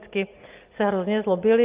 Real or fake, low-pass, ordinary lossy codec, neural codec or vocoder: real; 3.6 kHz; Opus, 24 kbps; none